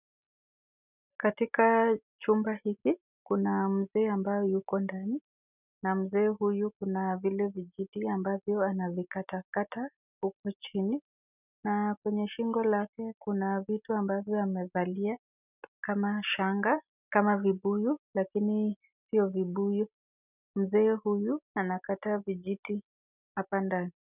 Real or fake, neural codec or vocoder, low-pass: real; none; 3.6 kHz